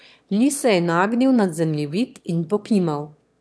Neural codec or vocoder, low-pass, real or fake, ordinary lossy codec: autoencoder, 22.05 kHz, a latent of 192 numbers a frame, VITS, trained on one speaker; none; fake; none